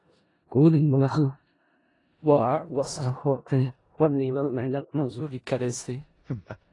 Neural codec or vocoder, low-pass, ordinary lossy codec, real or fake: codec, 16 kHz in and 24 kHz out, 0.4 kbps, LongCat-Audio-Codec, four codebook decoder; 10.8 kHz; AAC, 32 kbps; fake